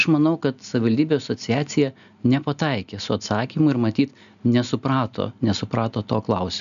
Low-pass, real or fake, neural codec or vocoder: 7.2 kHz; real; none